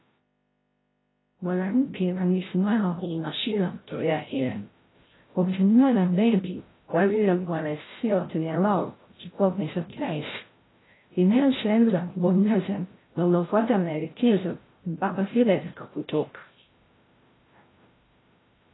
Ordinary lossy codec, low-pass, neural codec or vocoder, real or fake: AAC, 16 kbps; 7.2 kHz; codec, 16 kHz, 0.5 kbps, FreqCodec, larger model; fake